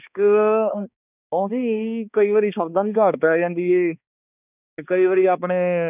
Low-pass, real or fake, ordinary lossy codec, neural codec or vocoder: 3.6 kHz; fake; none; codec, 16 kHz, 2 kbps, X-Codec, HuBERT features, trained on balanced general audio